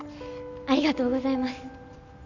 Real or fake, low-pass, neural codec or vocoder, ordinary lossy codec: real; 7.2 kHz; none; none